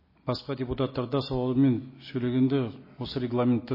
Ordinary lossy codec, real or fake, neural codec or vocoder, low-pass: MP3, 24 kbps; real; none; 5.4 kHz